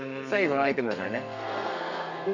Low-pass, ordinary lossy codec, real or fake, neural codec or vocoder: 7.2 kHz; none; fake; codec, 32 kHz, 1.9 kbps, SNAC